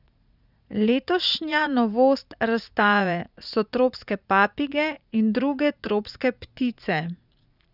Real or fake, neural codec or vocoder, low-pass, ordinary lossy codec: fake; vocoder, 44.1 kHz, 80 mel bands, Vocos; 5.4 kHz; none